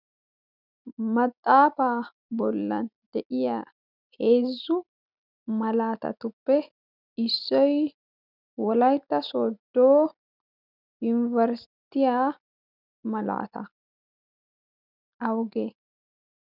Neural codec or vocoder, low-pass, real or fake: none; 5.4 kHz; real